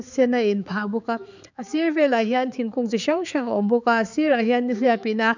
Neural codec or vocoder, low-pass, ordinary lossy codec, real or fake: codec, 16 kHz, 4 kbps, X-Codec, HuBERT features, trained on balanced general audio; 7.2 kHz; none; fake